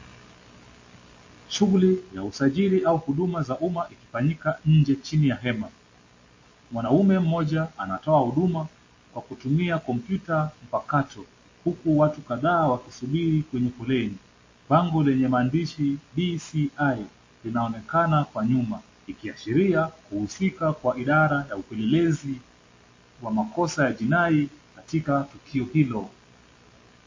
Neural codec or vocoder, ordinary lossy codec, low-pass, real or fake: none; MP3, 32 kbps; 7.2 kHz; real